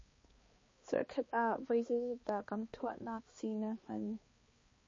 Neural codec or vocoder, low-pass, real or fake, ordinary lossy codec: codec, 16 kHz, 2 kbps, X-Codec, HuBERT features, trained on balanced general audio; 7.2 kHz; fake; MP3, 32 kbps